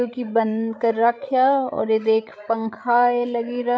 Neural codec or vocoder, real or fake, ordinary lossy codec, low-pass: codec, 16 kHz, 16 kbps, FreqCodec, larger model; fake; none; none